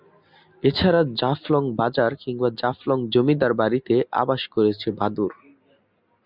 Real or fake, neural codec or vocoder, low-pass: real; none; 5.4 kHz